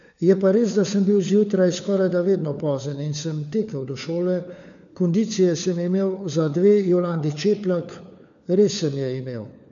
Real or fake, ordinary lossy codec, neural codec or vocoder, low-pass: fake; none; codec, 16 kHz, 4 kbps, FunCodec, trained on LibriTTS, 50 frames a second; 7.2 kHz